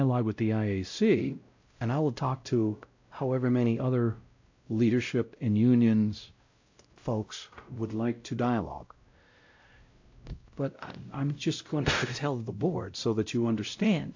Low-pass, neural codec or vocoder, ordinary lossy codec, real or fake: 7.2 kHz; codec, 16 kHz, 0.5 kbps, X-Codec, WavLM features, trained on Multilingual LibriSpeech; AAC, 48 kbps; fake